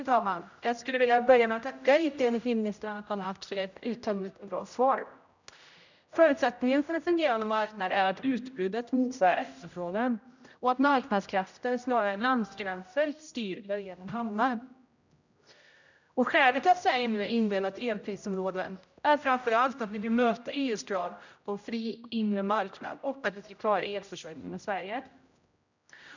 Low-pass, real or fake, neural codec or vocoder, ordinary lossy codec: 7.2 kHz; fake; codec, 16 kHz, 0.5 kbps, X-Codec, HuBERT features, trained on general audio; none